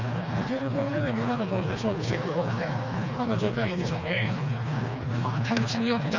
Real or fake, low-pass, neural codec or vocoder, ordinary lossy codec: fake; 7.2 kHz; codec, 16 kHz, 2 kbps, FreqCodec, smaller model; none